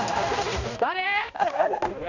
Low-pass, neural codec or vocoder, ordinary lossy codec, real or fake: 7.2 kHz; codec, 16 kHz, 1 kbps, X-Codec, HuBERT features, trained on balanced general audio; none; fake